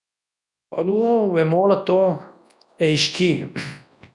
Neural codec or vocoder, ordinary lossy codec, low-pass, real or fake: codec, 24 kHz, 0.9 kbps, WavTokenizer, large speech release; none; 10.8 kHz; fake